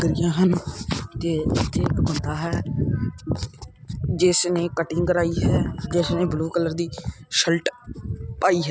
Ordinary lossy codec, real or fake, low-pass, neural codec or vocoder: none; real; none; none